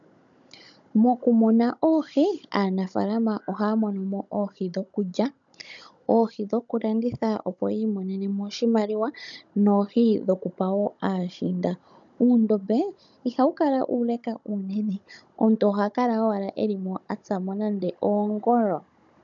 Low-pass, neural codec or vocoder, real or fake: 7.2 kHz; codec, 16 kHz, 16 kbps, FunCodec, trained on Chinese and English, 50 frames a second; fake